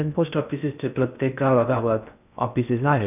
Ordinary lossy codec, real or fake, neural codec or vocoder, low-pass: none; fake; codec, 16 kHz in and 24 kHz out, 0.6 kbps, FocalCodec, streaming, 2048 codes; 3.6 kHz